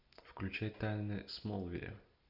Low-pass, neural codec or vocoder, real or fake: 5.4 kHz; none; real